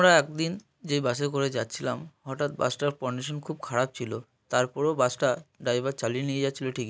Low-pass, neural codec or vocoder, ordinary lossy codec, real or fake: none; none; none; real